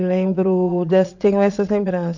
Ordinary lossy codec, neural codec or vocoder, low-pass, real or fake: none; vocoder, 22.05 kHz, 80 mel bands, Vocos; 7.2 kHz; fake